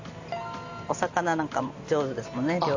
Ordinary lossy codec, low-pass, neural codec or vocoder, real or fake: AAC, 48 kbps; 7.2 kHz; none; real